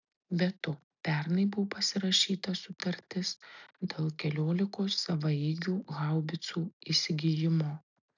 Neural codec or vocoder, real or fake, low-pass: none; real; 7.2 kHz